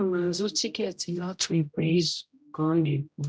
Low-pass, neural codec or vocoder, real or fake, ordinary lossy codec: none; codec, 16 kHz, 0.5 kbps, X-Codec, HuBERT features, trained on general audio; fake; none